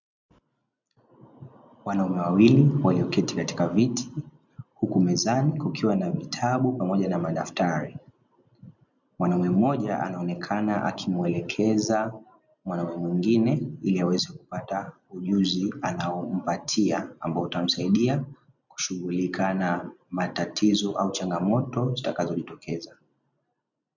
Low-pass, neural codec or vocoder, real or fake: 7.2 kHz; none; real